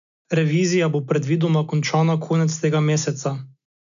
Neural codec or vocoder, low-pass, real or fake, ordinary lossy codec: none; 7.2 kHz; real; none